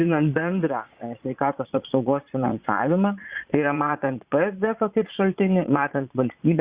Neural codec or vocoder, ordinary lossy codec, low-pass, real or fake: vocoder, 22.05 kHz, 80 mel bands, Vocos; Opus, 64 kbps; 3.6 kHz; fake